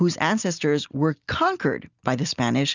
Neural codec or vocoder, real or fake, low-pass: none; real; 7.2 kHz